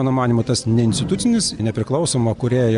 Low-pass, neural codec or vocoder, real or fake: 10.8 kHz; none; real